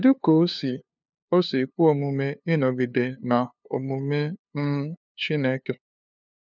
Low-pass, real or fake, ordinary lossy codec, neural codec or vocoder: 7.2 kHz; fake; none; codec, 16 kHz, 2 kbps, FunCodec, trained on LibriTTS, 25 frames a second